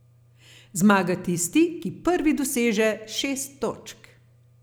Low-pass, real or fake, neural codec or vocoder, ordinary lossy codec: none; real; none; none